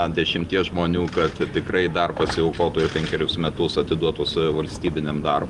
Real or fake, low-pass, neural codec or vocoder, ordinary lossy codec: real; 10.8 kHz; none; Opus, 24 kbps